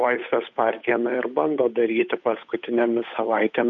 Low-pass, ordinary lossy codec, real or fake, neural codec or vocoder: 7.2 kHz; MP3, 48 kbps; fake; codec, 16 kHz, 8 kbps, FunCodec, trained on Chinese and English, 25 frames a second